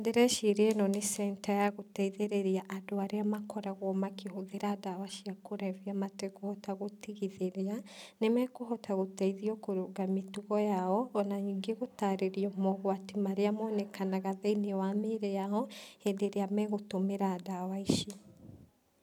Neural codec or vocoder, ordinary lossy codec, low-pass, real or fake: none; none; 19.8 kHz; real